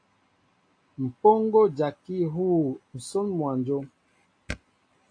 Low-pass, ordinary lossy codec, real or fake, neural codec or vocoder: 9.9 kHz; AAC, 48 kbps; real; none